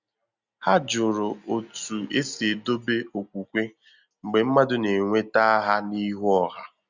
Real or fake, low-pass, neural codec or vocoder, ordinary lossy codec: real; 7.2 kHz; none; Opus, 64 kbps